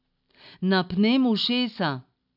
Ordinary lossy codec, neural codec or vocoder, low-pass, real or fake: none; none; 5.4 kHz; real